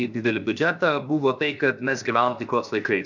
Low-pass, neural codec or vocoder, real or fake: 7.2 kHz; codec, 16 kHz, 0.7 kbps, FocalCodec; fake